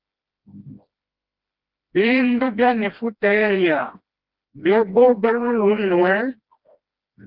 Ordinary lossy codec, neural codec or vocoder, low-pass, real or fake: Opus, 32 kbps; codec, 16 kHz, 1 kbps, FreqCodec, smaller model; 5.4 kHz; fake